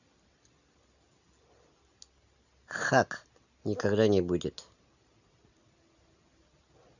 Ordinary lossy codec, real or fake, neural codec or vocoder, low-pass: none; real; none; 7.2 kHz